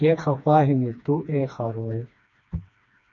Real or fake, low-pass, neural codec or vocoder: fake; 7.2 kHz; codec, 16 kHz, 2 kbps, FreqCodec, smaller model